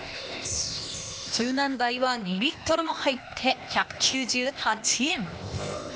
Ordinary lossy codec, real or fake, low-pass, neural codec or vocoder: none; fake; none; codec, 16 kHz, 0.8 kbps, ZipCodec